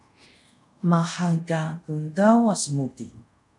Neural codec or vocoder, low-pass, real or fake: codec, 24 kHz, 0.5 kbps, DualCodec; 10.8 kHz; fake